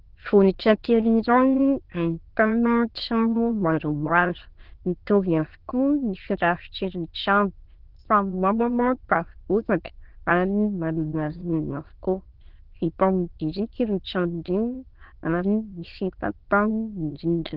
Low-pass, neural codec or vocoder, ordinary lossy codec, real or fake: 5.4 kHz; autoencoder, 22.05 kHz, a latent of 192 numbers a frame, VITS, trained on many speakers; Opus, 16 kbps; fake